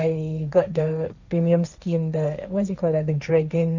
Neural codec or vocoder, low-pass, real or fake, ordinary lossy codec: codec, 16 kHz, 1.1 kbps, Voila-Tokenizer; 7.2 kHz; fake; none